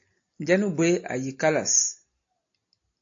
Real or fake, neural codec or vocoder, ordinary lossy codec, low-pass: real; none; AAC, 48 kbps; 7.2 kHz